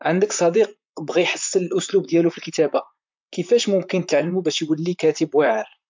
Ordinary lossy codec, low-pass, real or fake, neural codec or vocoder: MP3, 64 kbps; 7.2 kHz; fake; vocoder, 44.1 kHz, 128 mel bands, Pupu-Vocoder